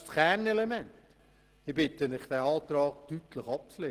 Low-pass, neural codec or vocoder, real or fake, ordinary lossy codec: 14.4 kHz; none; real; Opus, 16 kbps